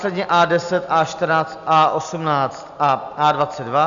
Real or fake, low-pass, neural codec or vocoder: real; 7.2 kHz; none